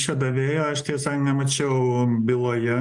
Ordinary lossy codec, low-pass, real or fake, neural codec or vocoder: Opus, 32 kbps; 10.8 kHz; real; none